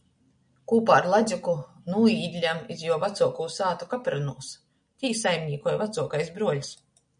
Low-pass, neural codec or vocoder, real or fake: 9.9 kHz; none; real